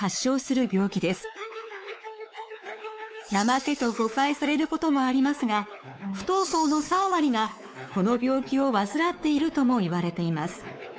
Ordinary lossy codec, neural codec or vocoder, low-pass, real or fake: none; codec, 16 kHz, 4 kbps, X-Codec, WavLM features, trained on Multilingual LibriSpeech; none; fake